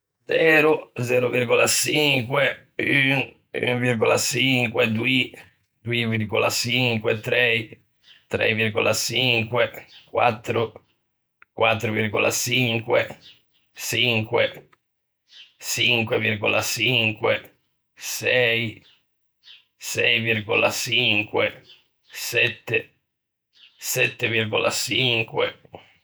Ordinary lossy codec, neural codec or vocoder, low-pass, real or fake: none; vocoder, 48 kHz, 128 mel bands, Vocos; none; fake